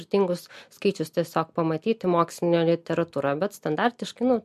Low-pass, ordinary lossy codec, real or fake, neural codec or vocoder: 14.4 kHz; MP3, 64 kbps; real; none